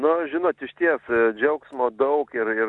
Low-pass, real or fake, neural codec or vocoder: 10.8 kHz; real; none